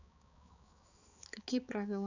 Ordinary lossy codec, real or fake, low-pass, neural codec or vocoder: none; fake; 7.2 kHz; codec, 16 kHz, 4 kbps, X-Codec, HuBERT features, trained on balanced general audio